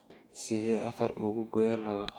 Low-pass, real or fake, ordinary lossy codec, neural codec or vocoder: 19.8 kHz; fake; none; codec, 44.1 kHz, 2.6 kbps, DAC